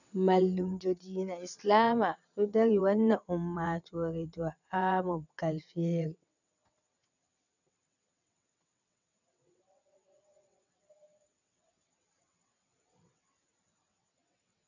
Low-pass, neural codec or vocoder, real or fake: 7.2 kHz; vocoder, 22.05 kHz, 80 mel bands, WaveNeXt; fake